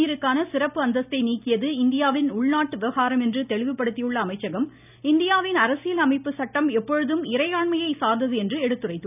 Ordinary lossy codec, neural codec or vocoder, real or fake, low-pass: none; none; real; 3.6 kHz